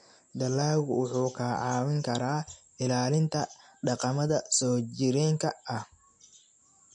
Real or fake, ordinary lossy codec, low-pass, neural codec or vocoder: real; MP3, 48 kbps; 9.9 kHz; none